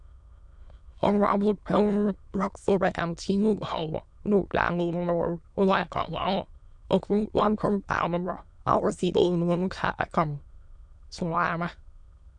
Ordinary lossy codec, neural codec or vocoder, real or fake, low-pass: none; autoencoder, 22.05 kHz, a latent of 192 numbers a frame, VITS, trained on many speakers; fake; 9.9 kHz